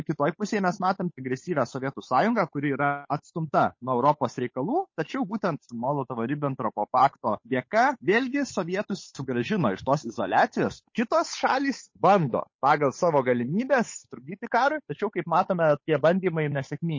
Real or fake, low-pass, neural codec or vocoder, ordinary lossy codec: fake; 7.2 kHz; codec, 16 kHz, 8 kbps, FunCodec, trained on Chinese and English, 25 frames a second; MP3, 32 kbps